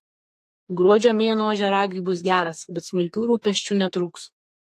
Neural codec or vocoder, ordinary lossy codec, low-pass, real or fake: codec, 32 kHz, 1.9 kbps, SNAC; AAC, 64 kbps; 14.4 kHz; fake